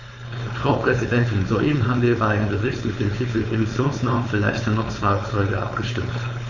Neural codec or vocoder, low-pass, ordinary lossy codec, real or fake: codec, 16 kHz, 4.8 kbps, FACodec; 7.2 kHz; none; fake